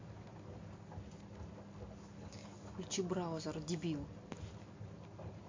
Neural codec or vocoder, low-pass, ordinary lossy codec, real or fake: none; 7.2 kHz; MP3, 48 kbps; real